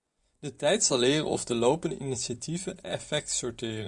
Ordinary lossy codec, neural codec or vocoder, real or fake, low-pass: Opus, 64 kbps; vocoder, 24 kHz, 100 mel bands, Vocos; fake; 10.8 kHz